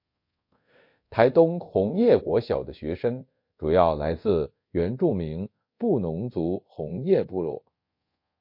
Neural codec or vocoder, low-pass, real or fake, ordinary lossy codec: codec, 24 kHz, 0.5 kbps, DualCodec; 5.4 kHz; fake; MP3, 32 kbps